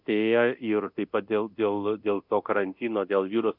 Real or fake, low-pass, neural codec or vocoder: fake; 5.4 kHz; codec, 24 kHz, 0.9 kbps, DualCodec